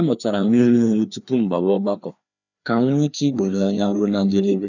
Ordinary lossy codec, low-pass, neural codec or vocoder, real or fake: none; 7.2 kHz; codec, 16 kHz, 2 kbps, FreqCodec, larger model; fake